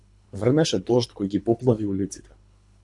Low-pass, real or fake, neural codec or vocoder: 10.8 kHz; fake; codec, 24 kHz, 3 kbps, HILCodec